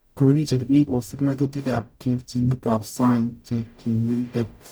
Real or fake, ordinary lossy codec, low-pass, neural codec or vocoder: fake; none; none; codec, 44.1 kHz, 0.9 kbps, DAC